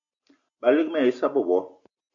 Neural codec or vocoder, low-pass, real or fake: none; 7.2 kHz; real